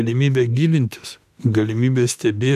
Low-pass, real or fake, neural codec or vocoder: 14.4 kHz; fake; autoencoder, 48 kHz, 32 numbers a frame, DAC-VAE, trained on Japanese speech